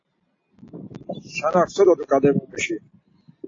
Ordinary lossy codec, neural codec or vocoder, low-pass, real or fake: AAC, 32 kbps; none; 7.2 kHz; real